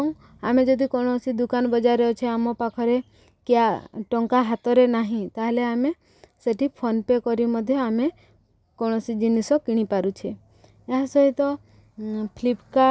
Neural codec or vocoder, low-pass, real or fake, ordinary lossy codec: none; none; real; none